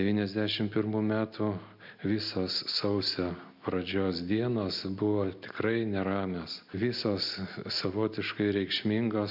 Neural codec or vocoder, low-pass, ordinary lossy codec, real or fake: none; 5.4 kHz; AAC, 48 kbps; real